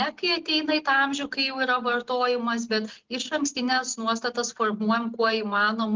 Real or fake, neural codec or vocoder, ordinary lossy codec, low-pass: real; none; Opus, 16 kbps; 7.2 kHz